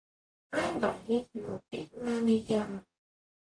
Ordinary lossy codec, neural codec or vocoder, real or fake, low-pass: AAC, 48 kbps; codec, 44.1 kHz, 0.9 kbps, DAC; fake; 9.9 kHz